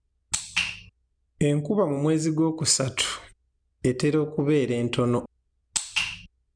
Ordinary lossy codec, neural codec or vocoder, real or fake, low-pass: none; none; real; 9.9 kHz